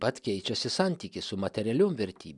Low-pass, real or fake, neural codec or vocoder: 10.8 kHz; real; none